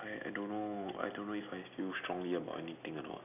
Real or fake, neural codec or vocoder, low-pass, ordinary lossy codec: real; none; 3.6 kHz; none